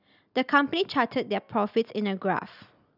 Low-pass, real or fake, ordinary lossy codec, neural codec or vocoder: 5.4 kHz; real; none; none